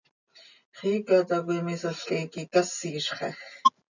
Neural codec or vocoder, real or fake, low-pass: none; real; 7.2 kHz